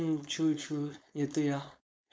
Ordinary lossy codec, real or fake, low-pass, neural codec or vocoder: none; fake; none; codec, 16 kHz, 4.8 kbps, FACodec